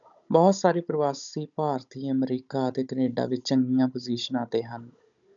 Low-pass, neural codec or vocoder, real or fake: 7.2 kHz; codec, 16 kHz, 16 kbps, FunCodec, trained on Chinese and English, 50 frames a second; fake